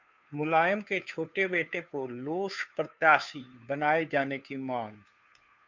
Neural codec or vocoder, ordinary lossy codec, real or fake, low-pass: codec, 16 kHz, 2 kbps, FunCodec, trained on Chinese and English, 25 frames a second; MP3, 64 kbps; fake; 7.2 kHz